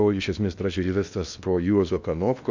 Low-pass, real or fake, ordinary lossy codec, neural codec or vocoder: 7.2 kHz; fake; MP3, 64 kbps; codec, 16 kHz in and 24 kHz out, 0.8 kbps, FocalCodec, streaming, 65536 codes